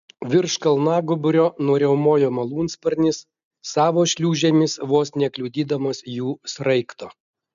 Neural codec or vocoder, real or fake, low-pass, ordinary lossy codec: none; real; 7.2 kHz; MP3, 96 kbps